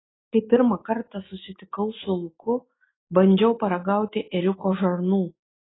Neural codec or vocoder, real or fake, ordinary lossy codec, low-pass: none; real; AAC, 16 kbps; 7.2 kHz